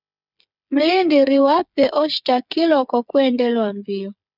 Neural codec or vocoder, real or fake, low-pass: codec, 16 kHz, 8 kbps, FreqCodec, smaller model; fake; 5.4 kHz